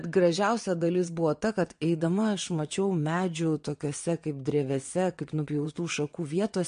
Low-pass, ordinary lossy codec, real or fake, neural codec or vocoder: 9.9 kHz; MP3, 48 kbps; fake; vocoder, 22.05 kHz, 80 mel bands, WaveNeXt